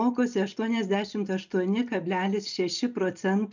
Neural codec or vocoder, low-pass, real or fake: none; 7.2 kHz; real